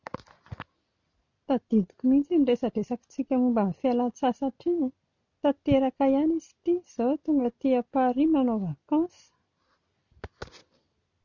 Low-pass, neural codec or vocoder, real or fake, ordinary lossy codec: 7.2 kHz; none; real; none